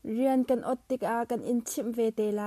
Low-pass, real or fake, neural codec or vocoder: 14.4 kHz; real; none